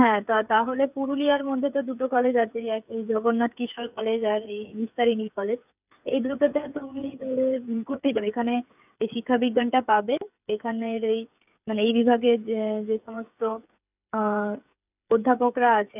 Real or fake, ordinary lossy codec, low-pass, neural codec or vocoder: fake; none; 3.6 kHz; codec, 16 kHz, 8 kbps, FreqCodec, smaller model